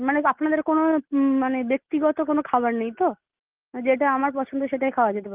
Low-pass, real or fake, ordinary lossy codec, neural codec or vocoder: 3.6 kHz; real; Opus, 32 kbps; none